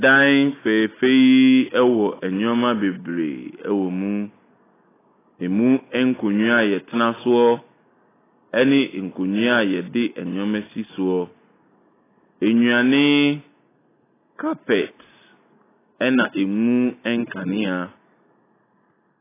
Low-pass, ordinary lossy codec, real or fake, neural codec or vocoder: 3.6 kHz; AAC, 16 kbps; real; none